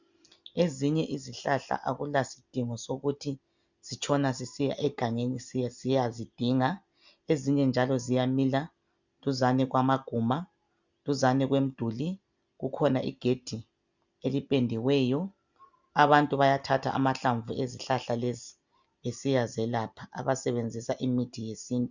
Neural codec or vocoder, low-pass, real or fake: none; 7.2 kHz; real